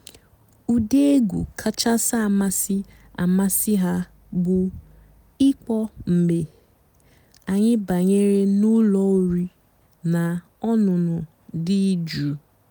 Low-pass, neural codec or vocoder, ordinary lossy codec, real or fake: none; none; none; real